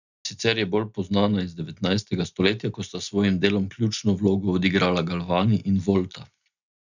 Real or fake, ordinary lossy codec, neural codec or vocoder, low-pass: real; none; none; 7.2 kHz